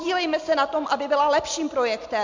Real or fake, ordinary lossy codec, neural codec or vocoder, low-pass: real; MP3, 64 kbps; none; 7.2 kHz